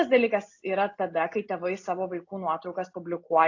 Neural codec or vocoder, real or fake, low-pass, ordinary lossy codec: none; real; 7.2 kHz; AAC, 48 kbps